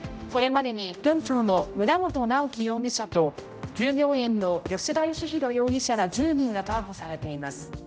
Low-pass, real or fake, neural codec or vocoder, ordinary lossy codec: none; fake; codec, 16 kHz, 0.5 kbps, X-Codec, HuBERT features, trained on general audio; none